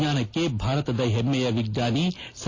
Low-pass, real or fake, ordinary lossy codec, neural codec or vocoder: 7.2 kHz; real; AAC, 32 kbps; none